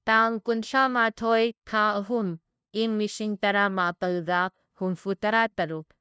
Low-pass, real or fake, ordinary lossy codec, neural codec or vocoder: none; fake; none; codec, 16 kHz, 0.5 kbps, FunCodec, trained on LibriTTS, 25 frames a second